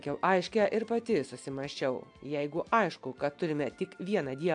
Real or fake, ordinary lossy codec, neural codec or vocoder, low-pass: real; AAC, 64 kbps; none; 9.9 kHz